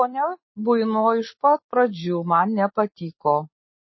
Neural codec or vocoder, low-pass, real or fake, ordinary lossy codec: none; 7.2 kHz; real; MP3, 24 kbps